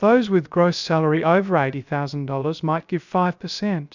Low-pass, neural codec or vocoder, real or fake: 7.2 kHz; codec, 16 kHz, 0.3 kbps, FocalCodec; fake